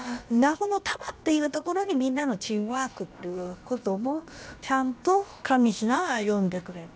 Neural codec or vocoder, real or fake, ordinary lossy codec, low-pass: codec, 16 kHz, about 1 kbps, DyCAST, with the encoder's durations; fake; none; none